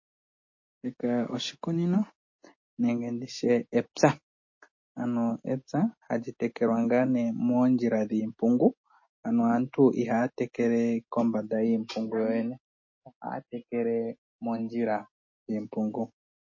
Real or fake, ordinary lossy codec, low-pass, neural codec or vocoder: real; MP3, 32 kbps; 7.2 kHz; none